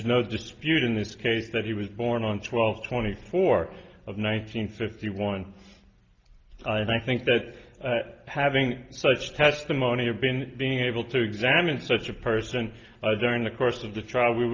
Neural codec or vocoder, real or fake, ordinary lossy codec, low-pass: none; real; Opus, 24 kbps; 7.2 kHz